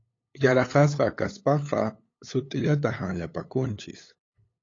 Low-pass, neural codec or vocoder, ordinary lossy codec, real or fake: 7.2 kHz; codec, 16 kHz, 8 kbps, FunCodec, trained on LibriTTS, 25 frames a second; AAC, 32 kbps; fake